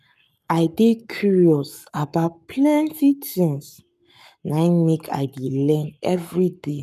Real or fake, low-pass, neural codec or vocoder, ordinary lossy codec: fake; 14.4 kHz; codec, 44.1 kHz, 7.8 kbps, Pupu-Codec; none